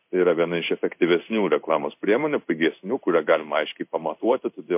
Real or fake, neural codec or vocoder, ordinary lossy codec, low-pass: fake; codec, 16 kHz in and 24 kHz out, 1 kbps, XY-Tokenizer; MP3, 32 kbps; 3.6 kHz